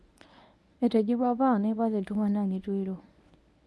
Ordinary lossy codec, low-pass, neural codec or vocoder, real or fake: none; none; codec, 24 kHz, 0.9 kbps, WavTokenizer, medium speech release version 1; fake